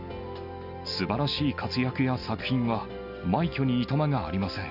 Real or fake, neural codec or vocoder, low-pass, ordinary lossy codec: real; none; 5.4 kHz; none